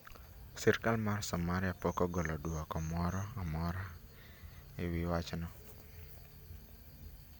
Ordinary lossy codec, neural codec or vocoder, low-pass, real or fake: none; none; none; real